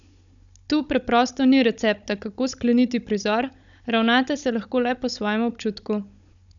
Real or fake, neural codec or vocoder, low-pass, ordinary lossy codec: fake; codec, 16 kHz, 16 kbps, FunCodec, trained on Chinese and English, 50 frames a second; 7.2 kHz; none